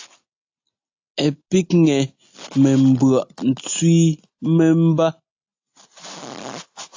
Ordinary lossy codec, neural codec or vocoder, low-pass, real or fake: AAC, 48 kbps; none; 7.2 kHz; real